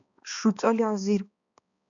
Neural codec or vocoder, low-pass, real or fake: codec, 16 kHz, 2 kbps, X-Codec, HuBERT features, trained on balanced general audio; 7.2 kHz; fake